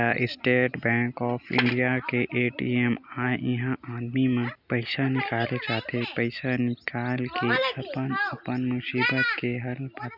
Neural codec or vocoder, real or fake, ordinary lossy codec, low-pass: none; real; none; 5.4 kHz